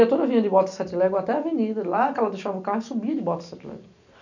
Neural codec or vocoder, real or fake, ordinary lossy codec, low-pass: none; real; none; 7.2 kHz